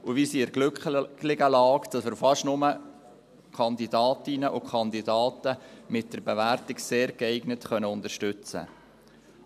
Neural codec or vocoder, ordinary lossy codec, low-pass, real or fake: none; none; 14.4 kHz; real